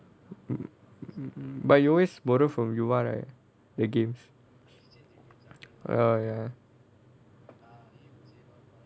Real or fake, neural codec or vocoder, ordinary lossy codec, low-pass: real; none; none; none